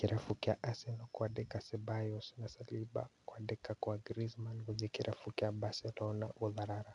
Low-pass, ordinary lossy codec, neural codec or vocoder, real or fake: 10.8 kHz; none; none; real